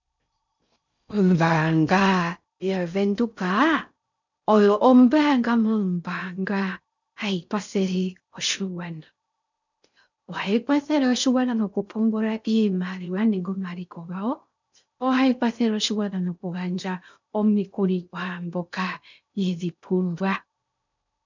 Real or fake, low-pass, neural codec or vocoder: fake; 7.2 kHz; codec, 16 kHz in and 24 kHz out, 0.6 kbps, FocalCodec, streaming, 4096 codes